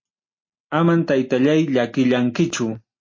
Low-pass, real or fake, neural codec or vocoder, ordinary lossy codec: 7.2 kHz; real; none; MP3, 32 kbps